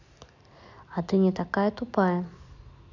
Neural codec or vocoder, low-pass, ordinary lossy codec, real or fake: none; 7.2 kHz; none; real